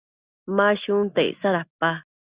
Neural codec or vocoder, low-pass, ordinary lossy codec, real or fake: none; 3.6 kHz; Opus, 64 kbps; real